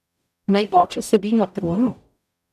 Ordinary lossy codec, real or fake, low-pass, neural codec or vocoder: none; fake; 14.4 kHz; codec, 44.1 kHz, 0.9 kbps, DAC